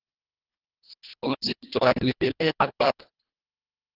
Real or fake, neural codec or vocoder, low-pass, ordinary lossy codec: fake; codec, 24 kHz, 1.5 kbps, HILCodec; 5.4 kHz; Opus, 16 kbps